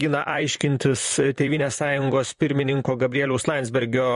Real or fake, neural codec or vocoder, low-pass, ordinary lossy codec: fake; vocoder, 44.1 kHz, 128 mel bands, Pupu-Vocoder; 14.4 kHz; MP3, 48 kbps